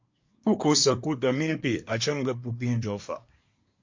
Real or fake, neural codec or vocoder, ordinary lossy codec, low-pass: fake; codec, 24 kHz, 1 kbps, SNAC; MP3, 48 kbps; 7.2 kHz